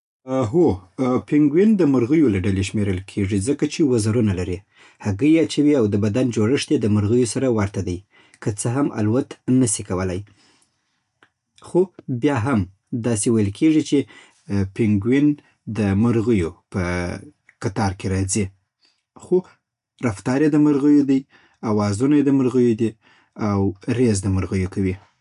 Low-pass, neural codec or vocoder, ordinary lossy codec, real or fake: 10.8 kHz; none; none; real